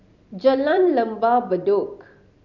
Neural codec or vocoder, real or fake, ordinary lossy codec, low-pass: vocoder, 44.1 kHz, 128 mel bands every 256 samples, BigVGAN v2; fake; none; 7.2 kHz